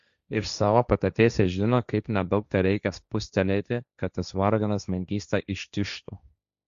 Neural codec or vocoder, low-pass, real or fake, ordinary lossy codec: codec, 16 kHz, 1.1 kbps, Voila-Tokenizer; 7.2 kHz; fake; Opus, 64 kbps